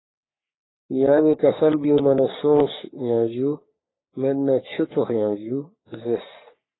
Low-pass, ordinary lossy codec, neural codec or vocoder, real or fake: 7.2 kHz; AAC, 16 kbps; codec, 44.1 kHz, 3.4 kbps, Pupu-Codec; fake